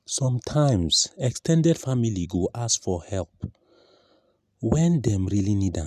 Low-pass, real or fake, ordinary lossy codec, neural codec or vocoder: 14.4 kHz; real; none; none